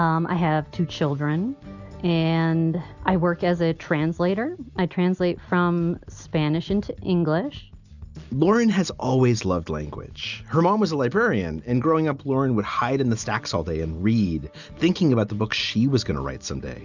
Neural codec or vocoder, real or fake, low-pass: none; real; 7.2 kHz